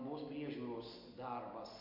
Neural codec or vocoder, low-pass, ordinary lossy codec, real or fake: none; 5.4 kHz; MP3, 32 kbps; real